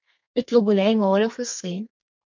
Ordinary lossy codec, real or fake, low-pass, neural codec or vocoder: MP3, 48 kbps; fake; 7.2 kHz; codec, 32 kHz, 1.9 kbps, SNAC